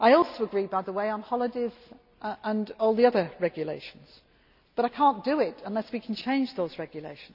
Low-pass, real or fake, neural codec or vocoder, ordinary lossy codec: 5.4 kHz; real; none; none